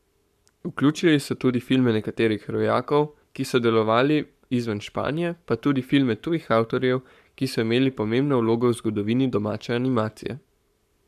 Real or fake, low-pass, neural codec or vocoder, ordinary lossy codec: fake; 14.4 kHz; codec, 44.1 kHz, 7.8 kbps, Pupu-Codec; MP3, 96 kbps